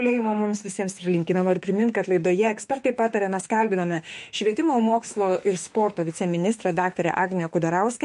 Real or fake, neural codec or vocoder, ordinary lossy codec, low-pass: fake; autoencoder, 48 kHz, 32 numbers a frame, DAC-VAE, trained on Japanese speech; MP3, 48 kbps; 14.4 kHz